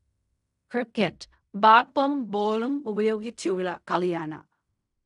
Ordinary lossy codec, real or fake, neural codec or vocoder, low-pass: none; fake; codec, 16 kHz in and 24 kHz out, 0.4 kbps, LongCat-Audio-Codec, fine tuned four codebook decoder; 10.8 kHz